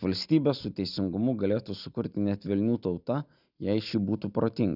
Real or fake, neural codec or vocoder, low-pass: real; none; 5.4 kHz